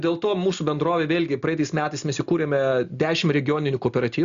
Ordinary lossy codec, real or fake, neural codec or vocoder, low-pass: Opus, 64 kbps; real; none; 7.2 kHz